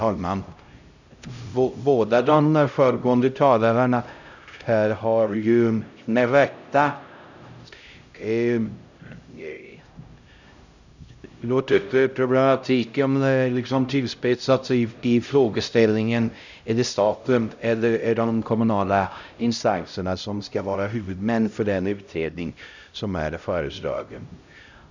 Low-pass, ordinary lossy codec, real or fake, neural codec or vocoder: 7.2 kHz; none; fake; codec, 16 kHz, 0.5 kbps, X-Codec, HuBERT features, trained on LibriSpeech